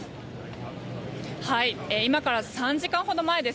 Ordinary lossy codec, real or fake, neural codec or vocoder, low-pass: none; real; none; none